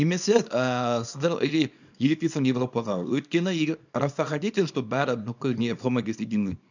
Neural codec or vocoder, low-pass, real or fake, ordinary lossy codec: codec, 24 kHz, 0.9 kbps, WavTokenizer, small release; 7.2 kHz; fake; none